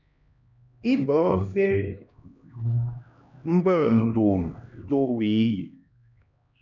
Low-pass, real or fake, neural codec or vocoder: 7.2 kHz; fake; codec, 16 kHz, 1 kbps, X-Codec, HuBERT features, trained on LibriSpeech